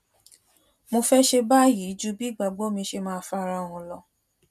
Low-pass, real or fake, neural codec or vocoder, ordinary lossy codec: 14.4 kHz; real; none; MP3, 96 kbps